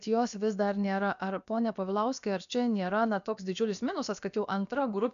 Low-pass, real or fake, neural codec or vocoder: 7.2 kHz; fake; codec, 16 kHz, about 1 kbps, DyCAST, with the encoder's durations